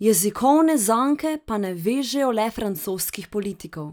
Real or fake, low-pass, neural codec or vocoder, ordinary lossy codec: real; none; none; none